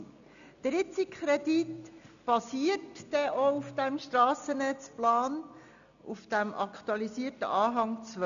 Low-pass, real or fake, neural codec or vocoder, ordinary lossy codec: 7.2 kHz; real; none; none